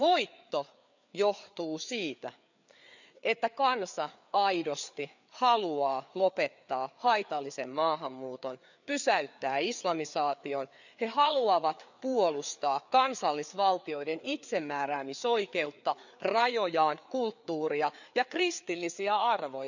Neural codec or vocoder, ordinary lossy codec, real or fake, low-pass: codec, 16 kHz, 4 kbps, FreqCodec, larger model; none; fake; 7.2 kHz